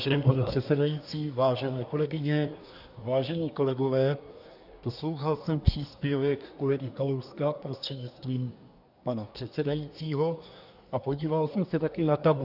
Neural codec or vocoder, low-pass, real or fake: codec, 24 kHz, 1 kbps, SNAC; 5.4 kHz; fake